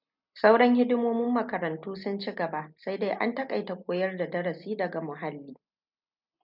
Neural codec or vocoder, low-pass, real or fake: none; 5.4 kHz; real